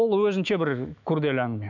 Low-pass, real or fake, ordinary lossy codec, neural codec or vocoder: 7.2 kHz; fake; none; autoencoder, 48 kHz, 128 numbers a frame, DAC-VAE, trained on Japanese speech